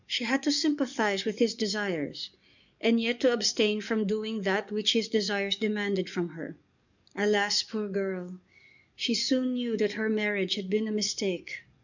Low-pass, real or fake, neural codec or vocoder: 7.2 kHz; fake; codec, 44.1 kHz, 7.8 kbps, DAC